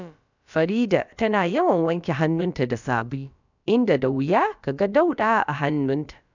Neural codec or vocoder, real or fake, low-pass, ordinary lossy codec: codec, 16 kHz, about 1 kbps, DyCAST, with the encoder's durations; fake; 7.2 kHz; none